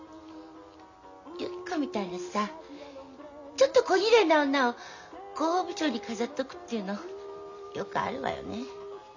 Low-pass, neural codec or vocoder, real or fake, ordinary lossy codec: 7.2 kHz; none; real; none